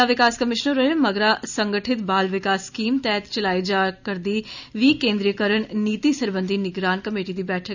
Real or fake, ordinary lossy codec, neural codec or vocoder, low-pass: real; none; none; 7.2 kHz